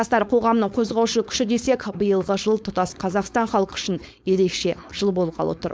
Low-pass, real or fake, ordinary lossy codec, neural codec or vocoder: none; fake; none; codec, 16 kHz, 4.8 kbps, FACodec